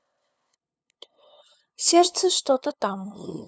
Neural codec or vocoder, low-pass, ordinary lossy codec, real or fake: codec, 16 kHz, 2 kbps, FunCodec, trained on LibriTTS, 25 frames a second; none; none; fake